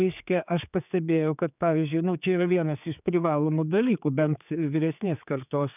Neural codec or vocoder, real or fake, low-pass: codec, 16 kHz, 4 kbps, X-Codec, HuBERT features, trained on general audio; fake; 3.6 kHz